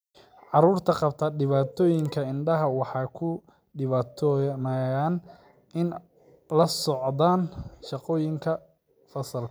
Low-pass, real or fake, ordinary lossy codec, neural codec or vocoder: none; real; none; none